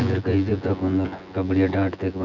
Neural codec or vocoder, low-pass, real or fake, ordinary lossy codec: vocoder, 24 kHz, 100 mel bands, Vocos; 7.2 kHz; fake; none